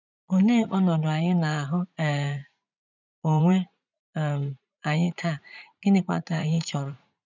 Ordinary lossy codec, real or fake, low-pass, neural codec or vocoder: none; fake; 7.2 kHz; vocoder, 44.1 kHz, 80 mel bands, Vocos